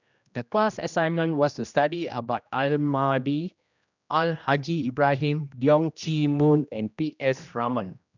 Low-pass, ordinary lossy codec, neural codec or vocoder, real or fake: 7.2 kHz; none; codec, 16 kHz, 1 kbps, X-Codec, HuBERT features, trained on general audio; fake